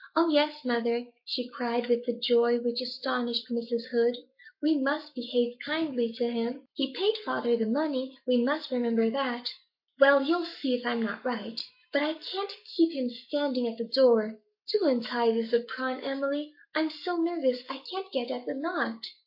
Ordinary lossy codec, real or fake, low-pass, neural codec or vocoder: MP3, 32 kbps; fake; 5.4 kHz; codec, 44.1 kHz, 7.8 kbps, Pupu-Codec